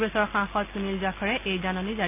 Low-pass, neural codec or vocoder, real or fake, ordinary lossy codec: 3.6 kHz; none; real; none